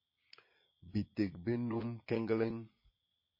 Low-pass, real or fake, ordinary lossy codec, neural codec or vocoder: 5.4 kHz; fake; MP3, 24 kbps; vocoder, 22.05 kHz, 80 mel bands, Vocos